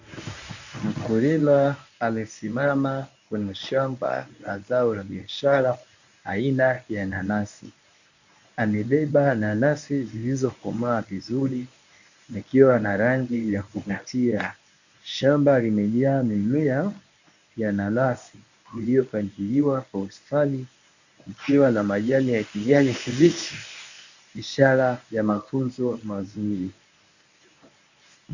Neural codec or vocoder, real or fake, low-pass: codec, 24 kHz, 0.9 kbps, WavTokenizer, medium speech release version 1; fake; 7.2 kHz